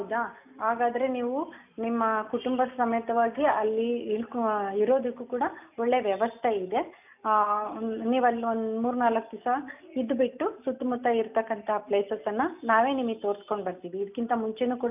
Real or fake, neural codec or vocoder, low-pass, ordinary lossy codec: real; none; 3.6 kHz; none